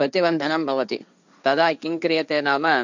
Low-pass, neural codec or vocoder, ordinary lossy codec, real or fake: 7.2 kHz; codec, 16 kHz, 1.1 kbps, Voila-Tokenizer; none; fake